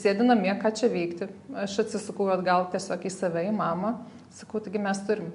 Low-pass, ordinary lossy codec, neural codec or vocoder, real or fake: 10.8 kHz; MP3, 64 kbps; none; real